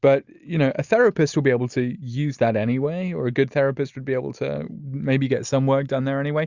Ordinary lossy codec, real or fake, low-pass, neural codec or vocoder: Opus, 64 kbps; real; 7.2 kHz; none